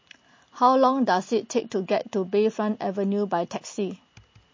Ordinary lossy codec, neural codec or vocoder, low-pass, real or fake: MP3, 32 kbps; none; 7.2 kHz; real